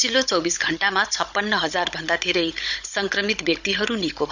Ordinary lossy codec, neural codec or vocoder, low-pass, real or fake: none; codec, 16 kHz, 16 kbps, FunCodec, trained on Chinese and English, 50 frames a second; 7.2 kHz; fake